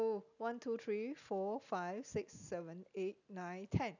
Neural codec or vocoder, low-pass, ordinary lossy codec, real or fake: none; 7.2 kHz; none; real